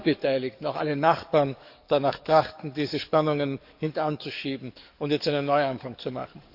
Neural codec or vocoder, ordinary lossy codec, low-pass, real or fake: codec, 44.1 kHz, 7.8 kbps, DAC; none; 5.4 kHz; fake